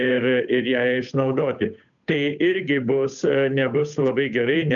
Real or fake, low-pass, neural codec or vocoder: fake; 7.2 kHz; codec, 16 kHz, 8 kbps, FunCodec, trained on Chinese and English, 25 frames a second